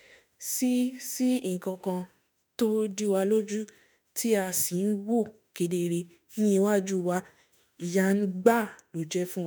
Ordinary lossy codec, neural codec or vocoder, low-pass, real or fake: none; autoencoder, 48 kHz, 32 numbers a frame, DAC-VAE, trained on Japanese speech; none; fake